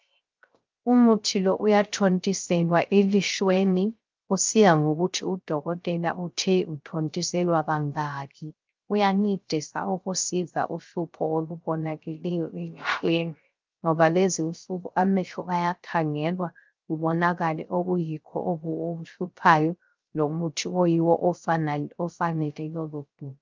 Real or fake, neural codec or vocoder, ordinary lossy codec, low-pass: fake; codec, 16 kHz, 0.3 kbps, FocalCodec; Opus, 24 kbps; 7.2 kHz